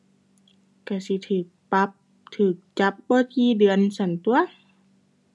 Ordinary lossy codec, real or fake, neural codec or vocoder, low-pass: none; real; none; none